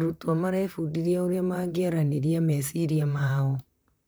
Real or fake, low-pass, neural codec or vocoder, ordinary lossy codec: fake; none; vocoder, 44.1 kHz, 128 mel bands, Pupu-Vocoder; none